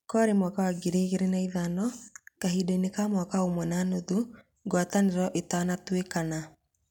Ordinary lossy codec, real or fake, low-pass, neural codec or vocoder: none; real; 19.8 kHz; none